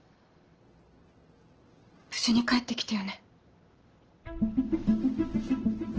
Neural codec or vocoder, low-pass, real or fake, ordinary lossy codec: none; 7.2 kHz; real; Opus, 16 kbps